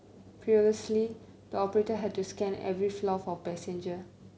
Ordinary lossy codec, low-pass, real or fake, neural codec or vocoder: none; none; real; none